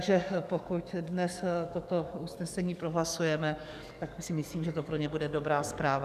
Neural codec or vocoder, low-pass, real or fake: codec, 44.1 kHz, 7.8 kbps, DAC; 14.4 kHz; fake